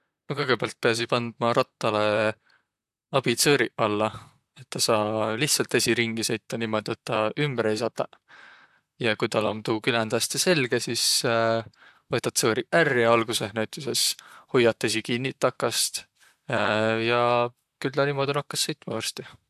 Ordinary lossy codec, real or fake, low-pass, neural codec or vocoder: AAC, 96 kbps; fake; 14.4 kHz; vocoder, 44.1 kHz, 128 mel bands, Pupu-Vocoder